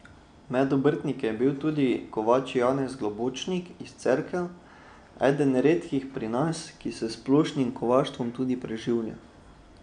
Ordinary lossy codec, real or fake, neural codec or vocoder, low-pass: none; real; none; 9.9 kHz